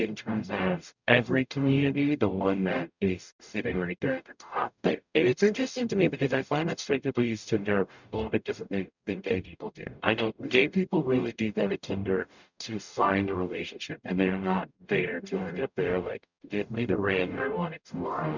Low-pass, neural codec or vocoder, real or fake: 7.2 kHz; codec, 44.1 kHz, 0.9 kbps, DAC; fake